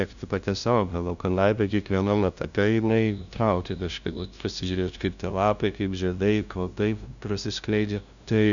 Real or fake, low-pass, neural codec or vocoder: fake; 7.2 kHz; codec, 16 kHz, 0.5 kbps, FunCodec, trained on LibriTTS, 25 frames a second